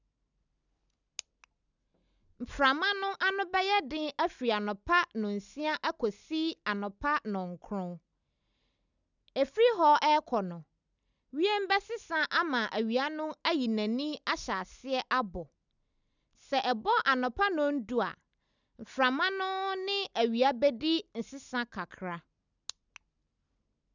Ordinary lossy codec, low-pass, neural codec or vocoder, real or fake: none; 7.2 kHz; none; real